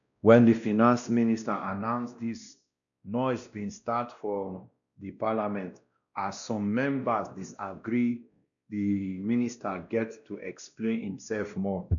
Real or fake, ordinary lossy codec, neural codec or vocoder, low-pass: fake; none; codec, 16 kHz, 1 kbps, X-Codec, WavLM features, trained on Multilingual LibriSpeech; 7.2 kHz